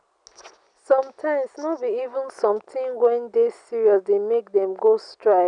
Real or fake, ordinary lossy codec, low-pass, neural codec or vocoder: real; none; 9.9 kHz; none